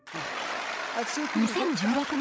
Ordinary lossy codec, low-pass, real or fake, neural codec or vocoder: none; none; fake; codec, 16 kHz, 16 kbps, FreqCodec, larger model